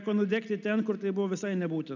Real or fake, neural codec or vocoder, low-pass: real; none; 7.2 kHz